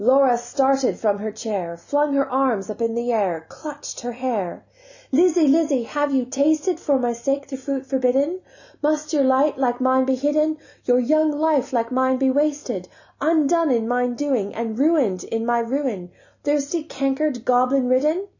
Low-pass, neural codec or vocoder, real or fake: 7.2 kHz; none; real